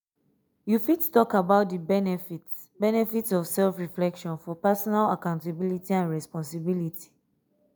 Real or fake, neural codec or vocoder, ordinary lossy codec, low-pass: real; none; none; none